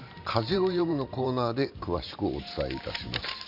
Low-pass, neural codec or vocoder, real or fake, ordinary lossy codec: 5.4 kHz; vocoder, 44.1 kHz, 128 mel bands every 512 samples, BigVGAN v2; fake; none